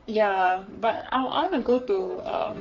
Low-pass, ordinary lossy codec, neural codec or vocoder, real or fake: 7.2 kHz; Opus, 64 kbps; codec, 44.1 kHz, 3.4 kbps, Pupu-Codec; fake